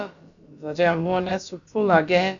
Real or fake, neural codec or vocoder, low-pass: fake; codec, 16 kHz, about 1 kbps, DyCAST, with the encoder's durations; 7.2 kHz